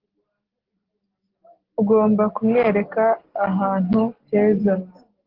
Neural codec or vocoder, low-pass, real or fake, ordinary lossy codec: none; 5.4 kHz; real; Opus, 16 kbps